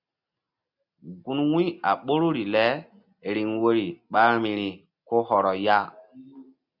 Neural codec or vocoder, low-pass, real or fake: none; 5.4 kHz; real